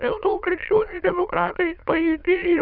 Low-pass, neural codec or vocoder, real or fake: 5.4 kHz; autoencoder, 22.05 kHz, a latent of 192 numbers a frame, VITS, trained on many speakers; fake